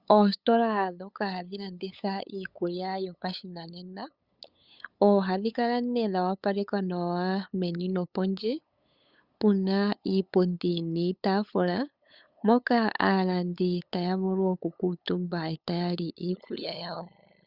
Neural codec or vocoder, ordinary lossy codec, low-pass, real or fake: codec, 16 kHz, 8 kbps, FunCodec, trained on LibriTTS, 25 frames a second; Opus, 64 kbps; 5.4 kHz; fake